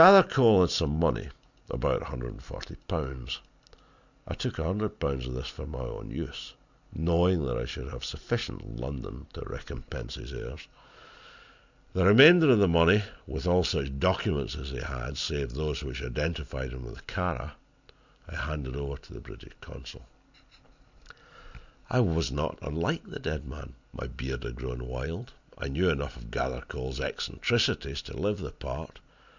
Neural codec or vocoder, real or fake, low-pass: none; real; 7.2 kHz